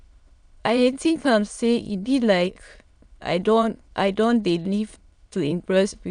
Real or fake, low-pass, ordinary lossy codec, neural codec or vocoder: fake; 9.9 kHz; none; autoencoder, 22.05 kHz, a latent of 192 numbers a frame, VITS, trained on many speakers